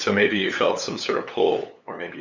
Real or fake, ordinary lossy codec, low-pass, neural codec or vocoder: fake; MP3, 64 kbps; 7.2 kHz; codec, 16 kHz, 8 kbps, FunCodec, trained on LibriTTS, 25 frames a second